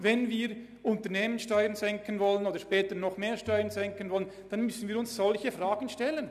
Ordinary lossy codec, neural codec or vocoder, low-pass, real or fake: none; none; 14.4 kHz; real